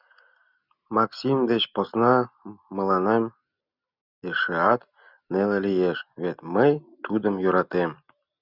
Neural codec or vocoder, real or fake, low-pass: none; real; 5.4 kHz